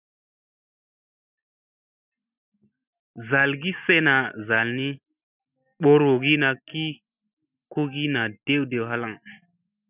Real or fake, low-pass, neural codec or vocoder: real; 3.6 kHz; none